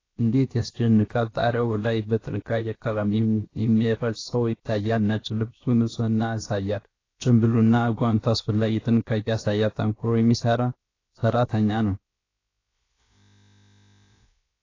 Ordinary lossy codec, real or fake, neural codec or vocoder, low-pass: AAC, 32 kbps; fake; codec, 16 kHz, 0.7 kbps, FocalCodec; 7.2 kHz